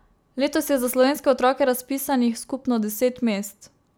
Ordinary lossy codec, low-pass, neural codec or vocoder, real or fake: none; none; none; real